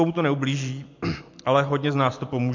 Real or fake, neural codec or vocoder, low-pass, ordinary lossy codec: real; none; 7.2 kHz; MP3, 48 kbps